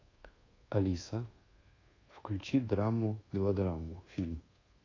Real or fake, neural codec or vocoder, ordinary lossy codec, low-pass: fake; codec, 24 kHz, 1.2 kbps, DualCodec; AAC, 32 kbps; 7.2 kHz